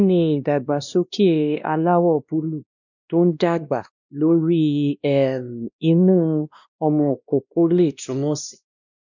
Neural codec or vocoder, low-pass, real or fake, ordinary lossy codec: codec, 16 kHz, 1 kbps, X-Codec, WavLM features, trained on Multilingual LibriSpeech; 7.2 kHz; fake; none